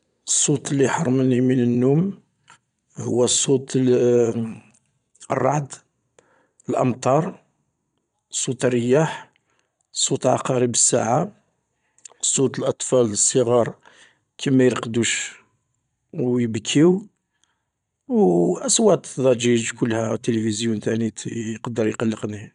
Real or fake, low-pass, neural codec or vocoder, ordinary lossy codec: fake; 9.9 kHz; vocoder, 22.05 kHz, 80 mel bands, Vocos; none